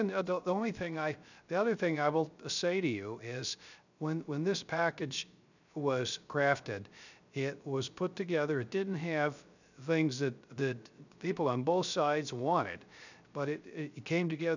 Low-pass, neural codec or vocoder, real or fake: 7.2 kHz; codec, 16 kHz, 0.3 kbps, FocalCodec; fake